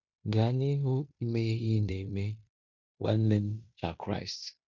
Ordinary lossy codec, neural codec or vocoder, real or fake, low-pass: none; codec, 16 kHz in and 24 kHz out, 0.9 kbps, LongCat-Audio-Codec, fine tuned four codebook decoder; fake; 7.2 kHz